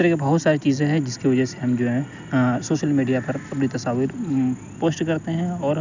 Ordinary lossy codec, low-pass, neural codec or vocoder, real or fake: none; 7.2 kHz; none; real